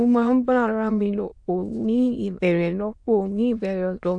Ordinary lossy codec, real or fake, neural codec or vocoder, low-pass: AAC, 64 kbps; fake; autoencoder, 22.05 kHz, a latent of 192 numbers a frame, VITS, trained on many speakers; 9.9 kHz